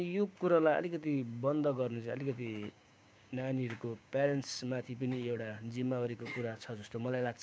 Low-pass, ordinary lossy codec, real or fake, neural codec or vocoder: none; none; fake; codec, 16 kHz, 6 kbps, DAC